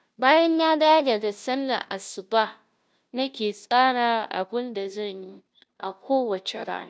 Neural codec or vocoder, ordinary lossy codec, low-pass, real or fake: codec, 16 kHz, 0.5 kbps, FunCodec, trained on Chinese and English, 25 frames a second; none; none; fake